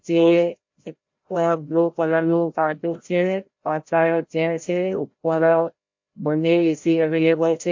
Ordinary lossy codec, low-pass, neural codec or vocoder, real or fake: MP3, 48 kbps; 7.2 kHz; codec, 16 kHz, 0.5 kbps, FreqCodec, larger model; fake